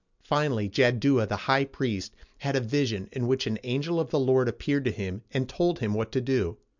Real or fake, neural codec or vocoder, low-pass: real; none; 7.2 kHz